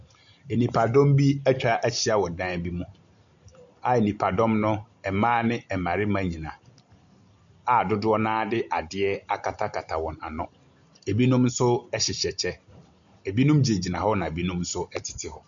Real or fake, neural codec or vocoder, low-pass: real; none; 7.2 kHz